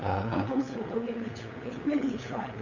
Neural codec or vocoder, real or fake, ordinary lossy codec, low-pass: codec, 16 kHz, 8 kbps, FunCodec, trained on LibriTTS, 25 frames a second; fake; none; 7.2 kHz